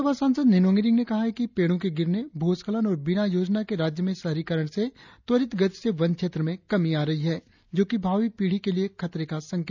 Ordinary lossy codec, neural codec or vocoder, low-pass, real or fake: none; none; 7.2 kHz; real